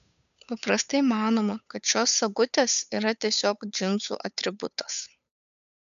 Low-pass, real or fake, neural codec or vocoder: 7.2 kHz; fake; codec, 16 kHz, 8 kbps, FunCodec, trained on Chinese and English, 25 frames a second